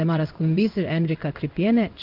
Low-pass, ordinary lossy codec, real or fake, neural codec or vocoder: 5.4 kHz; Opus, 24 kbps; fake; codec, 16 kHz in and 24 kHz out, 1 kbps, XY-Tokenizer